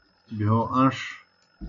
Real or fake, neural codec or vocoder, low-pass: real; none; 7.2 kHz